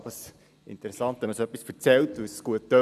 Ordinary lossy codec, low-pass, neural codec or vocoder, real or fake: none; 14.4 kHz; none; real